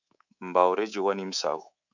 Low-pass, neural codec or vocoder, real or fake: 7.2 kHz; codec, 24 kHz, 3.1 kbps, DualCodec; fake